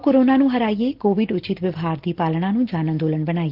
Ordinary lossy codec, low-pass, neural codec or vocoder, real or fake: Opus, 16 kbps; 5.4 kHz; none; real